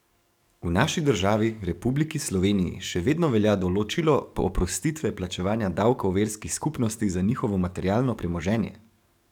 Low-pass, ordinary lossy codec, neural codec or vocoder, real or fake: 19.8 kHz; none; codec, 44.1 kHz, 7.8 kbps, DAC; fake